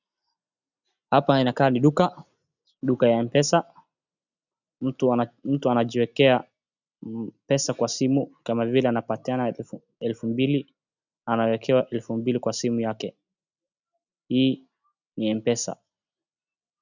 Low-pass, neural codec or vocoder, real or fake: 7.2 kHz; none; real